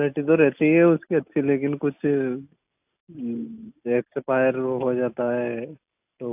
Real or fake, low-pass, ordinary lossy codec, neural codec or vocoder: real; 3.6 kHz; AAC, 32 kbps; none